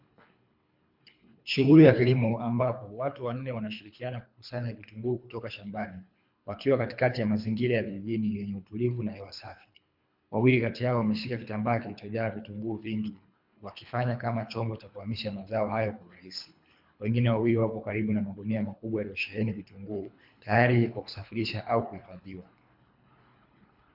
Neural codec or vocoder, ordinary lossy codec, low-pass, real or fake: codec, 24 kHz, 3 kbps, HILCodec; MP3, 48 kbps; 5.4 kHz; fake